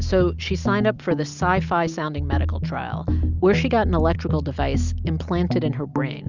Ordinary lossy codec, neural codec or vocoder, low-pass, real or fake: Opus, 64 kbps; none; 7.2 kHz; real